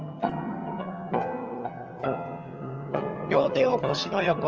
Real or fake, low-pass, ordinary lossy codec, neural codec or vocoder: fake; 7.2 kHz; Opus, 24 kbps; vocoder, 22.05 kHz, 80 mel bands, HiFi-GAN